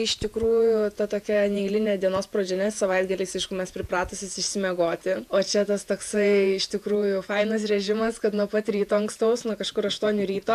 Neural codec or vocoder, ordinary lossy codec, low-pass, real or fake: vocoder, 48 kHz, 128 mel bands, Vocos; AAC, 96 kbps; 14.4 kHz; fake